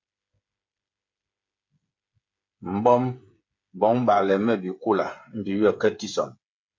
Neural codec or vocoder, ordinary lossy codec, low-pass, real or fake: codec, 16 kHz, 8 kbps, FreqCodec, smaller model; MP3, 48 kbps; 7.2 kHz; fake